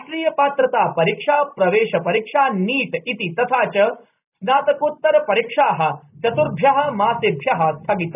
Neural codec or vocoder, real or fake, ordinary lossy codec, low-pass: none; real; none; 3.6 kHz